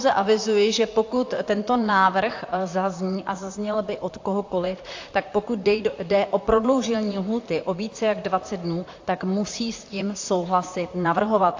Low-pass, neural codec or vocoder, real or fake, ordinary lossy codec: 7.2 kHz; vocoder, 44.1 kHz, 128 mel bands, Pupu-Vocoder; fake; AAC, 48 kbps